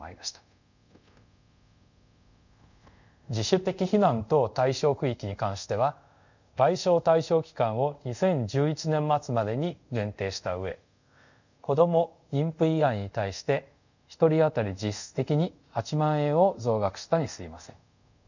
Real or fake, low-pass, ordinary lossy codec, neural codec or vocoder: fake; 7.2 kHz; none; codec, 24 kHz, 0.5 kbps, DualCodec